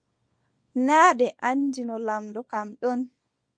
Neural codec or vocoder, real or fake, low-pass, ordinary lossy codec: codec, 24 kHz, 0.9 kbps, WavTokenizer, small release; fake; 9.9 kHz; MP3, 64 kbps